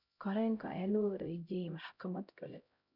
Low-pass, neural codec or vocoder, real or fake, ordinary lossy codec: 5.4 kHz; codec, 16 kHz, 0.5 kbps, X-Codec, HuBERT features, trained on LibriSpeech; fake; none